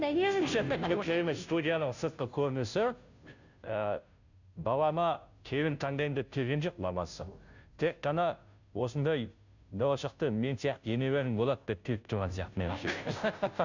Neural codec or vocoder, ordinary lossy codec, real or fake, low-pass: codec, 16 kHz, 0.5 kbps, FunCodec, trained on Chinese and English, 25 frames a second; none; fake; 7.2 kHz